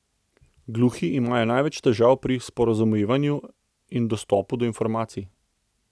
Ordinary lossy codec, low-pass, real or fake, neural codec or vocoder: none; none; real; none